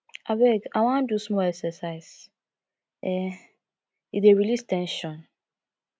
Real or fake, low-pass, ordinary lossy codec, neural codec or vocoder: real; none; none; none